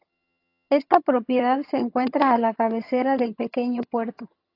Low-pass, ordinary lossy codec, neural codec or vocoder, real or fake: 5.4 kHz; AAC, 32 kbps; vocoder, 22.05 kHz, 80 mel bands, HiFi-GAN; fake